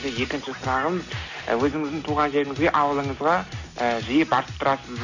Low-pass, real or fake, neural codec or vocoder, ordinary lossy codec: 7.2 kHz; real; none; none